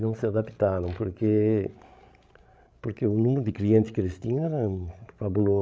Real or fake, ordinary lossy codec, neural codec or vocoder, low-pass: fake; none; codec, 16 kHz, 16 kbps, FreqCodec, larger model; none